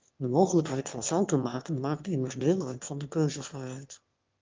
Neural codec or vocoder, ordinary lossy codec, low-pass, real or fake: autoencoder, 22.05 kHz, a latent of 192 numbers a frame, VITS, trained on one speaker; Opus, 24 kbps; 7.2 kHz; fake